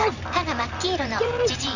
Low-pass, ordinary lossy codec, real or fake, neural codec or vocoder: 7.2 kHz; none; fake; vocoder, 44.1 kHz, 128 mel bands every 512 samples, BigVGAN v2